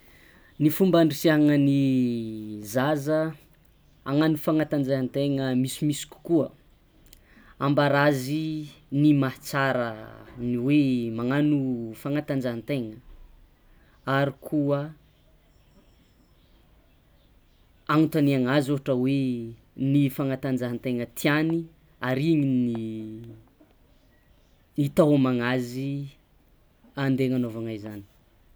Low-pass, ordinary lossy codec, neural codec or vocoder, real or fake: none; none; none; real